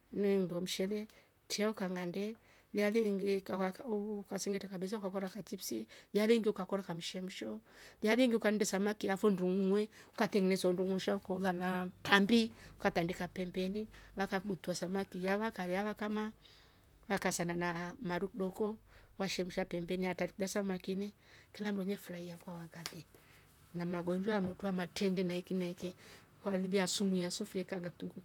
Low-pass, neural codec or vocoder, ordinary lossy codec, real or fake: 19.8 kHz; vocoder, 44.1 kHz, 128 mel bands, Pupu-Vocoder; none; fake